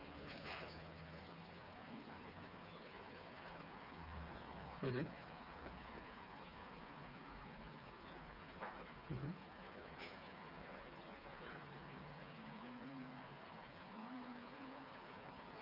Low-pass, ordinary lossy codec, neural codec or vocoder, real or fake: 5.4 kHz; none; codec, 16 kHz, 4 kbps, FreqCodec, smaller model; fake